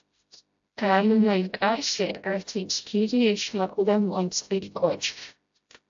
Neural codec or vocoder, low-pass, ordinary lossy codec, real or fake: codec, 16 kHz, 0.5 kbps, FreqCodec, smaller model; 7.2 kHz; AAC, 64 kbps; fake